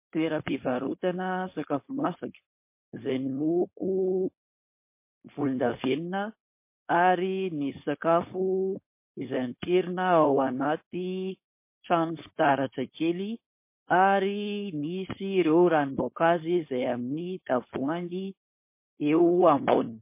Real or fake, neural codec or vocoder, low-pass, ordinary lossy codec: fake; codec, 16 kHz, 4.8 kbps, FACodec; 3.6 kHz; MP3, 24 kbps